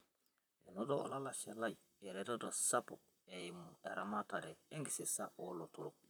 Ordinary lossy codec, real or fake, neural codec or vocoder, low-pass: none; fake; vocoder, 44.1 kHz, 128 mel bands, Pupu-Vocoder; none